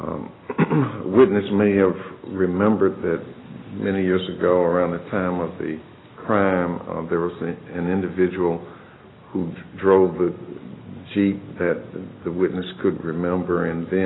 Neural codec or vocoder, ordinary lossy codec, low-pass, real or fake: none; AAC, 16 kbps; 7.2 kHz; real